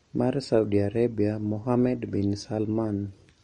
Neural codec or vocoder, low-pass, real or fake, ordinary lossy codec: none; 19.8 kHz; real; MP3, 48 kbps